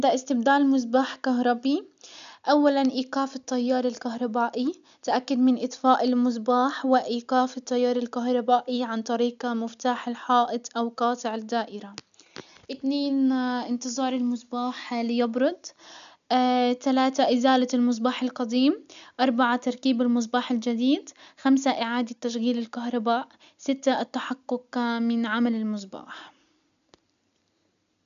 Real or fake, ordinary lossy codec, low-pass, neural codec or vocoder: real; none; 7.2 kHz; none